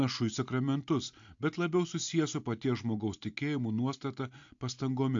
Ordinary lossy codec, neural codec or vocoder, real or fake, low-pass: AAC, 64 kbps; none; real; 7.2 kHz